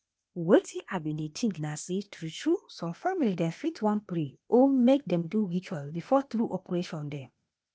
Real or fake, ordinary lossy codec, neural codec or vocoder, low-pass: fake; none; codec, 16 kHz, 0.8 kbps, ZipCodec; none